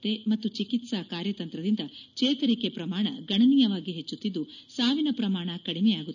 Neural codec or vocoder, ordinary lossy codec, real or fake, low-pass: none; none; real; 7.2 kHz